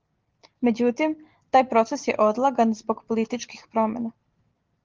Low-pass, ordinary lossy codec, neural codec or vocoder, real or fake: 7.2 kHz; Opus, 16 kbps; none; real